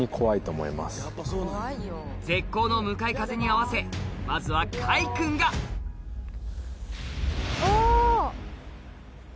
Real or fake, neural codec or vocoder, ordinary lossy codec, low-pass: real; none; none; none